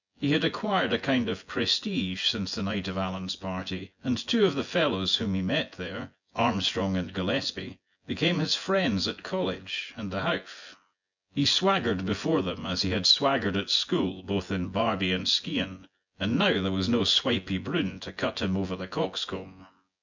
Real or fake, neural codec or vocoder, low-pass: fake; vocoder, 24 kHz, 100 mel bands, Vocos; 7.2 kHz